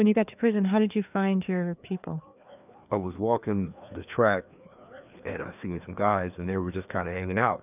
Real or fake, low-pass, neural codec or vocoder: fake; 3.6 kHz; codec, 16 kHz, 2 kbps, FreqCodec, larger model